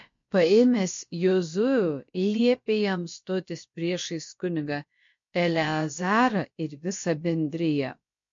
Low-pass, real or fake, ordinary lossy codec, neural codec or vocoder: 7.2 kHz; fake; MP3, 48 kbps; codec, 16 kHz, about 1 kbps, DyCAST, with the encoder's durations